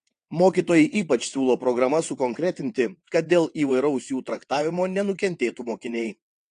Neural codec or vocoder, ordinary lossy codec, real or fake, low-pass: vocoder, 22.05 kHz, 80 mel bands, WaveNeXt; AAC, 48 kbps; fake; 9.9 kHz